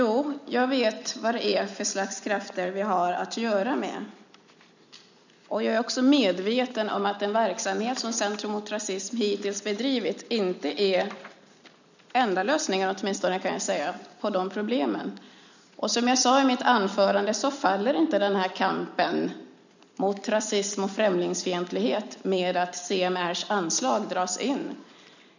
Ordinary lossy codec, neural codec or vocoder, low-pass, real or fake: none; none; 7.2 kHz; real